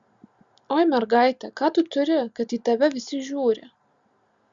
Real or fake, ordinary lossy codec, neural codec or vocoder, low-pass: real; Opus, 64 kbps; none; 7.2 kHz